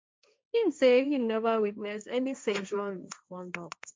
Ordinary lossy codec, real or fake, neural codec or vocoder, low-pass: none; fake; codec, 16 kHz, 1.1 kbps, Voila-Tokenizer; none